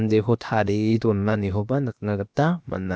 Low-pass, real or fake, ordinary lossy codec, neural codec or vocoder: none; fake; none; codec, 16 kHz, about 1 kbps, DyCAST, with the encoder's durations